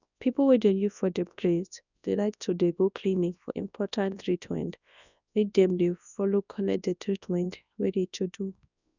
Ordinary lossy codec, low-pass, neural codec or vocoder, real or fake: none; 7.2 kHz; codec, 24 kHz, 0.9 kbps, WavTokenizer, large speech release; fake